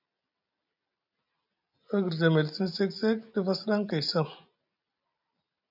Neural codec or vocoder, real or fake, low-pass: none; real; 5.4 kHz